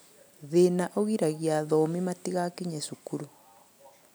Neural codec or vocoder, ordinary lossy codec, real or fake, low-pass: none; none; real; none